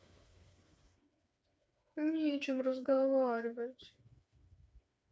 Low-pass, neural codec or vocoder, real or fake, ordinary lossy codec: none; codec, 16 kHz, 4 kbps, FreqCodec, smaller model; fake; none